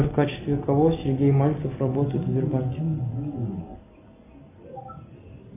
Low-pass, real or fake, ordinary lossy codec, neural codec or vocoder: 3.6 kHz; real; AAC, 24 kbps; none